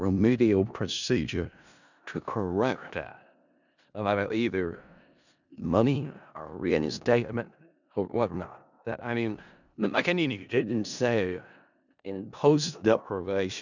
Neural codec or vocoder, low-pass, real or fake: codec, 16 kHz in and 24 kHz out, 0.4 kbps, LongCat-Audio-Codec, four codebook decoder; 7.2 kHz; fake